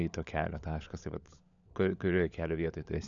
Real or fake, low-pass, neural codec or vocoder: fake; 7.2 kHz; codec, 16 kHz, 16 kbps, FunCodec, trained on LibriTTS, 50 frames a second